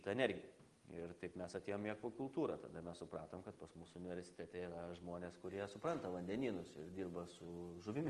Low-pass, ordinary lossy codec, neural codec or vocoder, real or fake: 10.8 kHz; Opus, 24 kbps; none; real